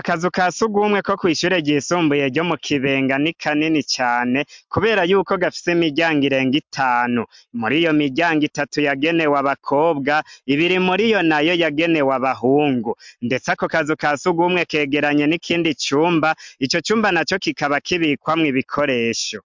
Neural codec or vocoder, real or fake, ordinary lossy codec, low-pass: none; real; MP3, 64 kbps; 7.2 kHz